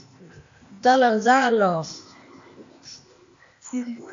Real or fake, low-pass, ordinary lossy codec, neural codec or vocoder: fake; 7.2 kHz; AAC, 64 kbps; codec, 16 kHz, 0.8 kbps, ZipCodec